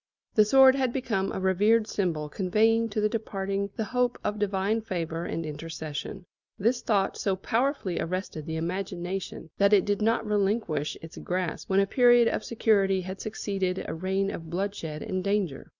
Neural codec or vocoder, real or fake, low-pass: none; real; 7.2 kHz